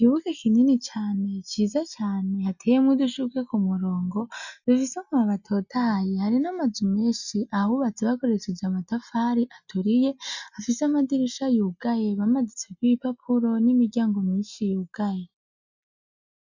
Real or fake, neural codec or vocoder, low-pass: real; none; 7.2 kHz